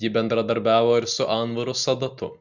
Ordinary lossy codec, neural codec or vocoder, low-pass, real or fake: Opus, 64 kbps; none; 7.2 kHz; real